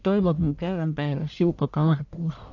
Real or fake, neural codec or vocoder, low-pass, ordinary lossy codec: fake; codec, 44.1 kHz, 1.7 kbps, Pupu-Codec; 7.2 kHz; AAC, 48 kbps